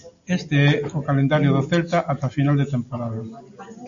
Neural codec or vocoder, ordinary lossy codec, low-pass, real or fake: none; MP3, 64 kbps; 7.2 kHz; real